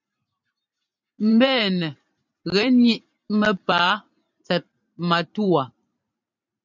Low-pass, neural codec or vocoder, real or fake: 7.2 kHz; vocoder, 44.1 kHz, 128 mel bands every 512 samples, BigVGAN v2; fake